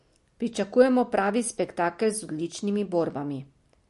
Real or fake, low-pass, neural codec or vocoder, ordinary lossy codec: real; 14.4 kHz; none; MP3, 48 kbps